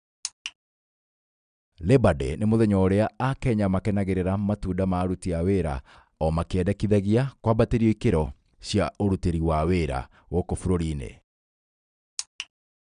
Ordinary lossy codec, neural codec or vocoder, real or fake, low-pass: none; none; real; 9.9 kHz